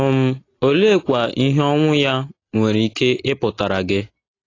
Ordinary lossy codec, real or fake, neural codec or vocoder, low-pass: AAC, 32 kbps; real; none; 7.2 kHz